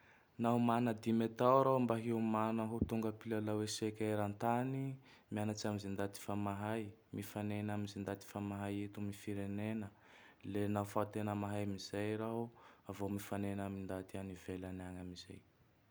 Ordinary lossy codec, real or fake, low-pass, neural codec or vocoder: none; real; none; none